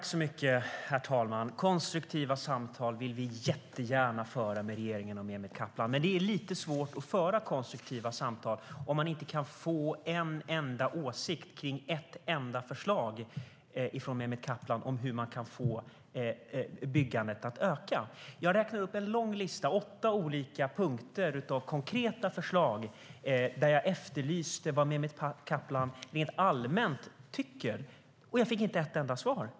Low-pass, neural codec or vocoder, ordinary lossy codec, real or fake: none; none; none; real